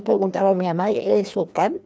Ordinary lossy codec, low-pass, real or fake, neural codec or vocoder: none; none; fake; codec, 16 kHz, 2 kbps, FreqCodec, larger model